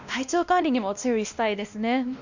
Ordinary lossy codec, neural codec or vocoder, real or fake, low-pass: none; codec, 16 kHz, 1 kbps, X-Codec, WavLM features, trained on Multilingual LibriSpeech; fake; 7.2 kHz